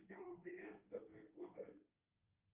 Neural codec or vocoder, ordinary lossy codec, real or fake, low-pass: codec, 24 kHz, 1 kbps, SNAC; Opus, 24 kbps; fake; 3.6 kHz